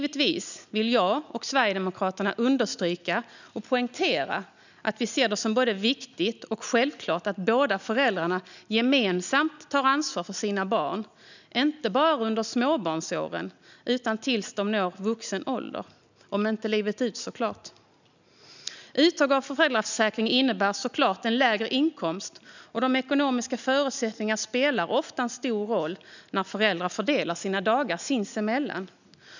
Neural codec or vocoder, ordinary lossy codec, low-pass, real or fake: none; none; 7.2 kHz; real